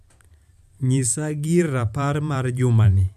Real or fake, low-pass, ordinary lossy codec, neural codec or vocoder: fake; 14.4 kHz; none; vocoder, 44.1 kHz, 128 mel bands every 512 samples, BigVGAN v2